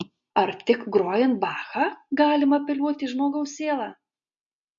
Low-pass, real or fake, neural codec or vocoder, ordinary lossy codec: 7.2 kHz; real; none; MP3, 48 kbps